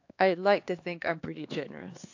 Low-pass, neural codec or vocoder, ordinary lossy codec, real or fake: 7.2 kHz; codec, 16 kHz, 2 kbps, X-Codec, HuBERT features, trained on LibriSpeech; AAC, 48 kbps; fake